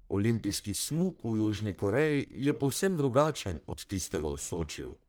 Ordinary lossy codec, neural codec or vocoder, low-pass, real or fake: none; codec, 44.1 kHz, 1.7 kbps, Pupu-Codec; none; fake